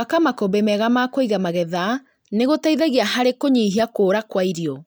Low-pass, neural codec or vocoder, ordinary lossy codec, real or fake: none; none; none; real